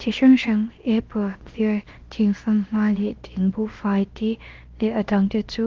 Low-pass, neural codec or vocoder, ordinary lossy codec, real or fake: 7.2 kHz; codec, 16 kHz, about 1 kbps, DyCAST, with the encoder's durations; Opus, 16 kbps; fake